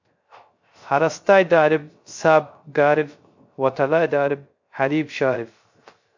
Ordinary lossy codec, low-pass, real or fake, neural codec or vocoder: MP3, 48 kbps; 7.2 kHz; fake; codec, 16 kHz, 0.3 kbps, FocalCodec